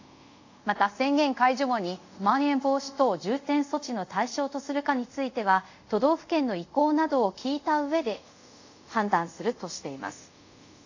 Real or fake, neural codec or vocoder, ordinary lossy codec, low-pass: fake; codec, 24 kHz, 0.5 kbps, DualCodec; AAC, 48 kbps; 7.2 kHz